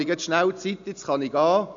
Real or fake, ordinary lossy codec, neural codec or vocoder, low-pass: real; none; none; 7.2 kHz